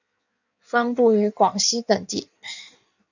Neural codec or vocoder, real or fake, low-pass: codec, 16 kHz in and 24 kHz out, 1.1 kbps, FireRedTTS-2 codec; fake; 7.2 kHz